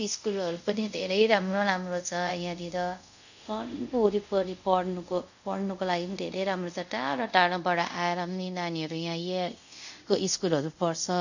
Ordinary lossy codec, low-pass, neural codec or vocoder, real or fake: none; 7.2 kHz; codec, 24 kHz, 0.5 kbps, DualCodec; fake